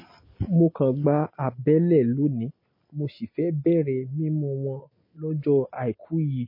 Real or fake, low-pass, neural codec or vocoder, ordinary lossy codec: real; 5.4 kHz; none; MP3, 24 kbps